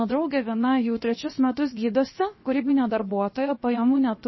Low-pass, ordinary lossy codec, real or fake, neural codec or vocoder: 7.2 kHz; MP3, 24 kbps; fake; codec, 16 kHz, 0.7 kbps, FocalCodec